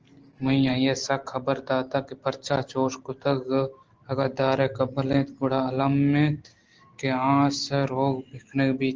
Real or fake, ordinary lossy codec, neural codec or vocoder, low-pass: real; Opus, 16 kbps; none; 7.2 kHz